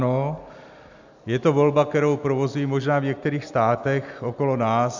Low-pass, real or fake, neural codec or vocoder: 7.2 kHz; real; none